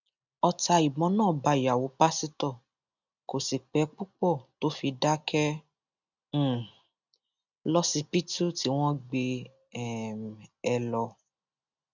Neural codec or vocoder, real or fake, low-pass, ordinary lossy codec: none; real; 7.2 kHz; none